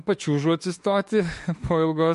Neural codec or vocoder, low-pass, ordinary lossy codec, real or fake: autoencoder, 48 kHz, 32 numbers a frame, DAC-VAE, trained on Japanese speech; 14.4 kHz; MP3, 48 kbps; fake